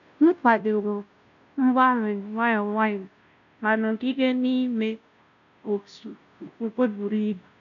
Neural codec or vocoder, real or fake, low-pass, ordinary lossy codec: codec, 16 kHz, 0.5 kbps, FunCodec, trained on Chinese and English, 25 frames a second; fake; 7.2 kHz; none